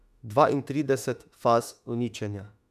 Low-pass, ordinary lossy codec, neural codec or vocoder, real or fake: 14.4 kHz; none; autoencoder, 48 kHz, 32 numbers a frame, DAC-VAE, trained on Japanese speech; fake